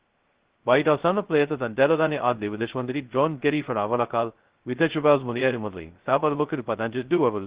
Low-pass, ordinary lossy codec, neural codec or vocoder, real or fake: 3.6 kHz; Opus, 16 kbps; codec, 16 kHz, 0.2 kbps, FocalCodec; fake